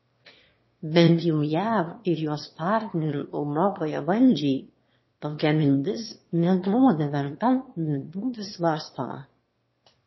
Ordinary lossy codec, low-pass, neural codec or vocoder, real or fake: MP3, 24 kbps; 7.2 kHz; autoencoder, 22.05 kHz, a latent of 192 numbers a frame, VITS, trained on one speaker; fake